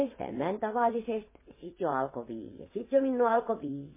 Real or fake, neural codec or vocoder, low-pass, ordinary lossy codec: fake; vocoder, 22.05 kHz, 80 mel bands, WaveNeXt; 3.6 kHz; MP3, 16 kbps